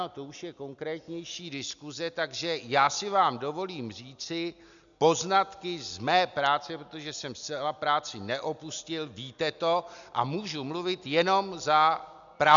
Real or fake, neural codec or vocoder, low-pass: real; none; 7.2 kHz